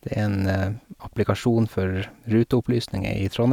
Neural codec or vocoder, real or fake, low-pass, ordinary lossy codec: none; real; 19.8 kHz; none